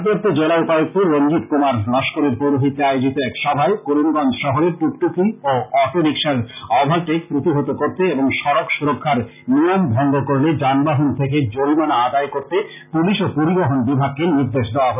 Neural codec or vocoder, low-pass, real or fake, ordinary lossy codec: none; 3.6 kHz; real; none